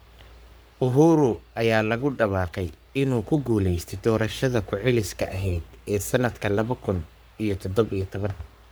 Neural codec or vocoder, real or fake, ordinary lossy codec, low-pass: codec, 44.1 kHz, 3.4 kbps, Pupu-Codec; fake; none; none